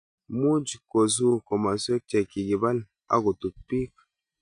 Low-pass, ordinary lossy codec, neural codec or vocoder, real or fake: 9.9 kHz; none; none; real